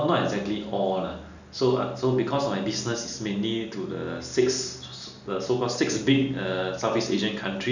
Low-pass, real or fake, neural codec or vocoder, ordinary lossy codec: 7.2 kHz; real; none; none